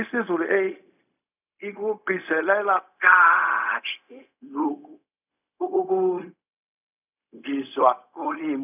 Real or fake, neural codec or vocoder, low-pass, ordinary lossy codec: fake; codec, 16 kHz, 0.4 kbps, LongCat-Audio-Codec; 3.6 kHz; none